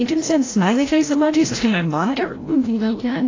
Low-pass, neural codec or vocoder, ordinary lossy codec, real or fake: 7.2 kHz; codec, 16 kHz, 0.5 kbps, FreqCodec, larger model; AAC, 32 kbps; fake